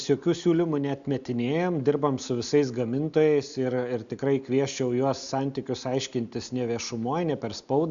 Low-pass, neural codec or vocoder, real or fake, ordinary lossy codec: 7.2 kHz; none; real; Opus, 64 kbps